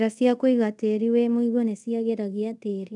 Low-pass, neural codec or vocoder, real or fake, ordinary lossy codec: 10.8 kHz; codec, 24 kHz, 0.5 kbps, DualCodec; fake; none